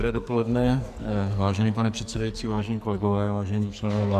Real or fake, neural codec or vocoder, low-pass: fake; codec, 44.1 kHz, 2.6 kbps, SNAC; 14.4 kHz